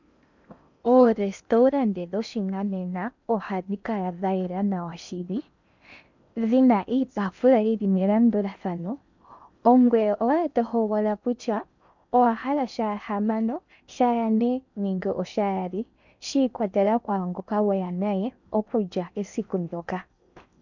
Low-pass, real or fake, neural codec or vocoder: 7.2 kHz; fake; codec, 16 kHz in and 24 kHz out, 0.8 kbps, FocalCodec, streaming, 65536 codes